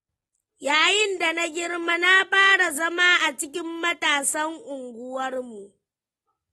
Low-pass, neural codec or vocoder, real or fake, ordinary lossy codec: 19.8 kHz; none; real; AAC, 32 kbps